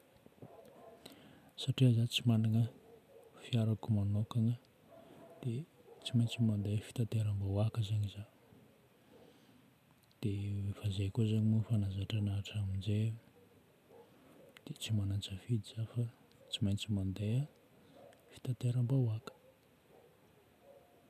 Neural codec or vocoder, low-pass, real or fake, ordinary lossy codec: none; 14.4 kHz; real; none